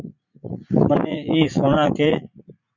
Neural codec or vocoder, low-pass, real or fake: vocoder, 44.1 kHz, 80 mel bands, Vocos; 7.2 kHz; fake